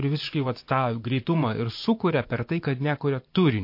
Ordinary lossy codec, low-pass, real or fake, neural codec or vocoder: MP3, 32 kbps; 5.4 kHz; fake; vocoder, 24 kHz, 100 mel bands, Vocos